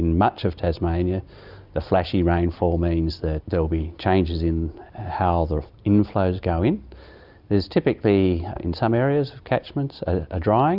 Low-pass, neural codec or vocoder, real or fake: 5.4 kHz; none; real